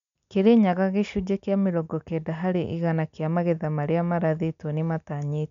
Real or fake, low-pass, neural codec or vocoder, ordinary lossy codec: real; 7.2 kHz; none; none